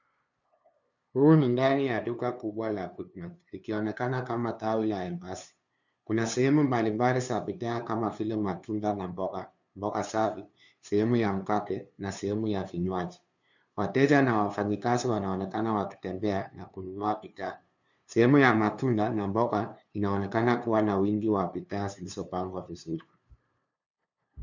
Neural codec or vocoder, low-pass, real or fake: codec, 16 kHz, 2 kbps, FunCodec, trained on LibriTTS, 25 frames a second; 7.2 kHz; fake